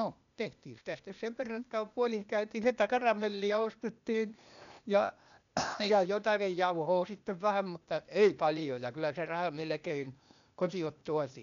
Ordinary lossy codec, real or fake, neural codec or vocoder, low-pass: MP3, 96 kbps; fake; codec, 16 kHz, 0.8 kbps, ZipCodec; 7.2 kHz